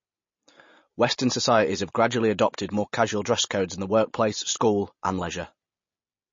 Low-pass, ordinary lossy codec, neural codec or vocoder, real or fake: 7.2 kHz; MP3, 32 kbps; none; real